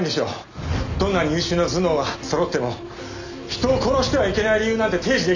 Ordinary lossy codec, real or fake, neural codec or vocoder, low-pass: none; real; none; 7.2 kHz